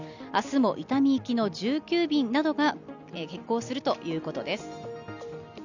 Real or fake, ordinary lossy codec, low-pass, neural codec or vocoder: real; none; 7.2 kHz; none